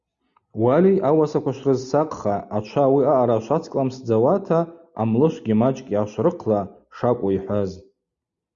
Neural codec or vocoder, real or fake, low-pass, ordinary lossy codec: none; real; 7.2 kHz; Opus, 64 kbps